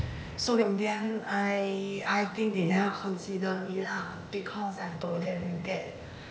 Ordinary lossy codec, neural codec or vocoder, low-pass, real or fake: none; codec, 16 kHz, 0.8 kbps, ZipCodec; none; fake